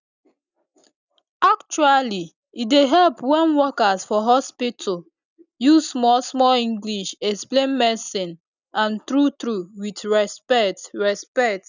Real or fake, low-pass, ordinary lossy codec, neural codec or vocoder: real; 7.2 kHz; none; none